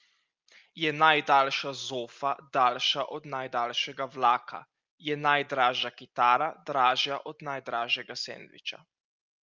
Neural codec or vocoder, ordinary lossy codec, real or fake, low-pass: none; Opus, 24 kbps; real; 7.2 kHz